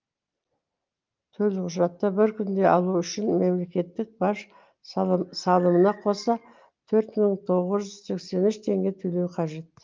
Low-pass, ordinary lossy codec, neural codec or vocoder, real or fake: 7.2 kHz; Opus, 24 kbps; none; real